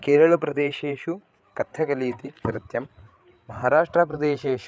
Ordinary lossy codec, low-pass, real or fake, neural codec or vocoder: none; none; fake; codec, 16 kHz, 8 kbps, FreqCodec, larger model